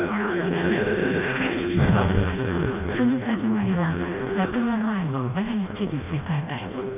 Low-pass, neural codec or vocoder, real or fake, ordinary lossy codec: 3.6 kHz; codec, 16 kHz, 1 kbps, FreqCodec, smaller model; fake; none